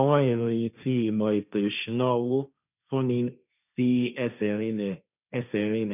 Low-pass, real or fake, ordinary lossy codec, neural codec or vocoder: 3.6 kHz; fake; none; codec, 16 kHz, 1.1 kbps, Voila-Tokenizer